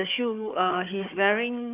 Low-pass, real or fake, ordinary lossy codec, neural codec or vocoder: 3.6 kHz; fake; none; codec, 16 kHz, 4 kbps, FunCodec, trained on Chinese and English, 50 frames a second